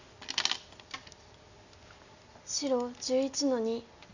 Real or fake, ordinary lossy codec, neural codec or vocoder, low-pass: real; none; none; 7.2 kHz